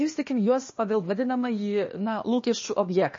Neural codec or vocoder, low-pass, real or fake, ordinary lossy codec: codec, 16 kHz, 0.8 kbps, ZipCodec; 7.2 kHz; fake; MP3, 32 kbps